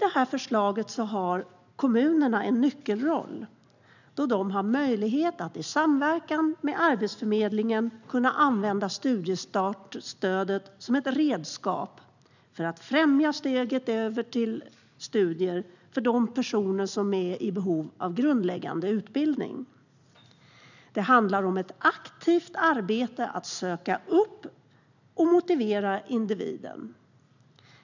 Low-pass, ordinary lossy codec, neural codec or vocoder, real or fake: 7.2 kHz; none; none; real